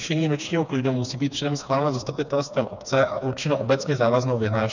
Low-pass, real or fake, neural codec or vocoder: 7.2 kHz; fake; codec, 16 kHz, 2 kbps, FreqCodec, smaller model